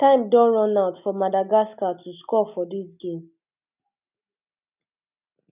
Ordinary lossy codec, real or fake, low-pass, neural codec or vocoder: none; real; 3.6 kHz; none